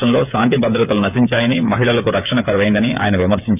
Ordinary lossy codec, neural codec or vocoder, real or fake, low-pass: none; codec, 16 kHz, 8 kbps, FreqCodec, smaller model; fake; 3.6 kHz